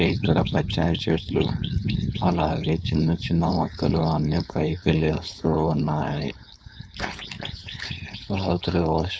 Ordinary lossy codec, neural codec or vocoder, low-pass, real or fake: none; codec, 16 kHz, 4.8 kbps, FACodec; none; fake